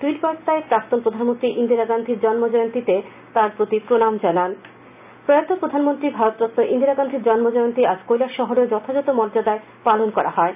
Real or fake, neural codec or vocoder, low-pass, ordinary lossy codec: real; none; 3.6 kHz; none